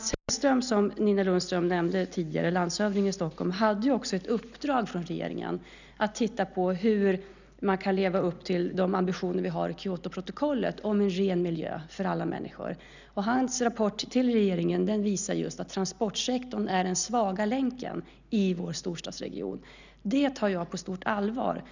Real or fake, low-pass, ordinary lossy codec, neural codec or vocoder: real; 7.2 kHz; none; none